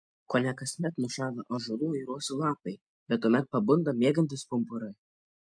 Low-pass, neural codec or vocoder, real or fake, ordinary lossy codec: 9.9 kHz; none; real; MP3, 64 kbps